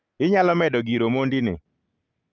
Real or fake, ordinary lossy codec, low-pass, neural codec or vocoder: real; Opus, 24 kbps; 7.2 kHz; none